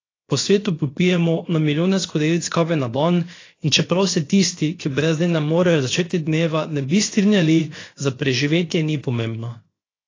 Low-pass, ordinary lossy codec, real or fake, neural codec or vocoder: 7.2 kHz; AAC, 32 kbps; fake; codec, 16 kHz, 0.7 kbps, FocalCodec